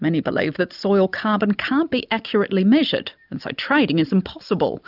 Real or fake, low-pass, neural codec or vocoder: real; 5.4 kHz; none